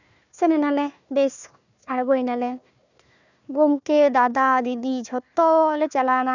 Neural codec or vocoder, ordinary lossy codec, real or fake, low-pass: codec, 16 kHz, 1 kbps, FunCodec, trained on Chinese and English, 50 frames a second; none; fake; 7.2 kHz